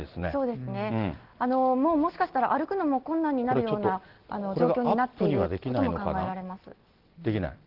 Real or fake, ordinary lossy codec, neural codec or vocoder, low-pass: real; Opus, 16 kbps; none; 5.4 kHz